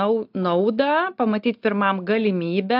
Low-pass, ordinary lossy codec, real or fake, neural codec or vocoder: 5.4 kHz; AAC, 48 kbps; real; none